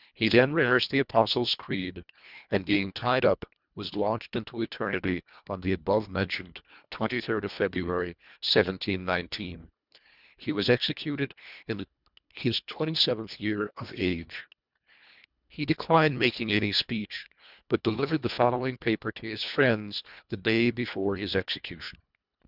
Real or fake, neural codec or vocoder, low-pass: fake; codec, 24 kHz, 1.5 kbps, HILCodec; 5.4 kHz